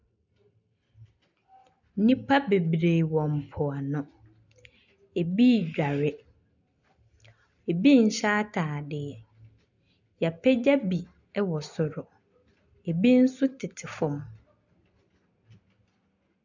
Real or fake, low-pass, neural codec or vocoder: real; 7.2 kHz; none